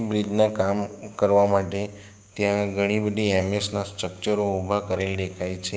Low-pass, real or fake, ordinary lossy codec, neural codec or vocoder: none; fake; none; codec, 16 kHz, 6 kbps, DAC